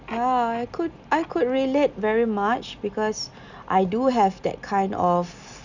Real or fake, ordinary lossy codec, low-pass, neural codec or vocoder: real; none; 7.2 kHz; none